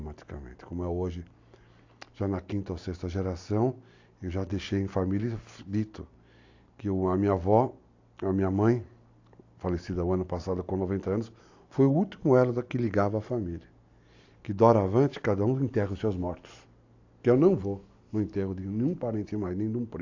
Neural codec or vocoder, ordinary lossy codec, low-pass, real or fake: none; AAC, 48 kbps; 7.2 kHz; real